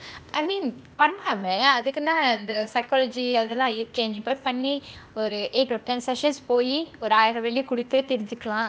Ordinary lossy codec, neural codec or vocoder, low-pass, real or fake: none; codec, 16 kHz, 0.8 kbps, ZipCodec; none; fake